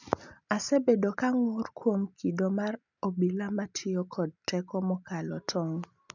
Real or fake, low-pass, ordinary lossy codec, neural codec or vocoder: real; 7.2 kHz; none; none